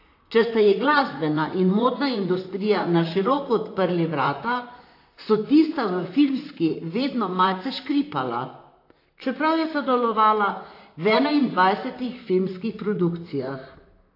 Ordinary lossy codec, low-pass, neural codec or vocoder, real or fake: AAC, 32 kbps; 5.4 kHz; vocoder, 44.1 kHz, 128 mel bands, Pupu-Vocoder; fake